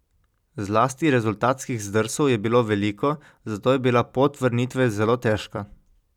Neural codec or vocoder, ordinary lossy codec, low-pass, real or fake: vocoder, 44.1 kHz, 128 mel bands every 256 samples, BigVGAN v2; none; 19.8 kHz; fake